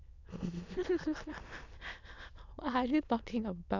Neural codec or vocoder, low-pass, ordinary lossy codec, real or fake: autoencoder, 22.05 kHz, a latent of 192 numbers a frame, VITS, trained on many speakers; 7.2 kHz; none; fake